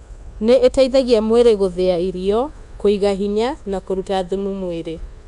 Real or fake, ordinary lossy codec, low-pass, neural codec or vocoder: fake; none; 10.8 kHz; codec, 24 kHz, 1.2 kbps, DualCodec